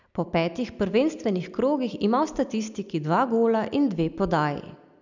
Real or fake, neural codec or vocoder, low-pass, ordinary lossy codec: real; none; 7.2 kHz; none